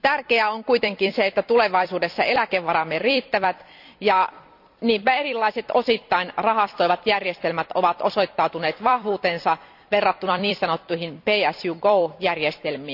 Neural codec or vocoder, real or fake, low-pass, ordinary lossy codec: vocoder, 44.1 kHz, 128 mel bands every 256 samples, BigVGAN v2; fake; 5.4 kHz; none